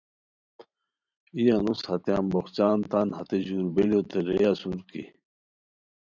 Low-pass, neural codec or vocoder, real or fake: 7.2 kHz; vocoder, 44.1 kHz, 128 mel bands every 512 samples, BigVGAN v2; fake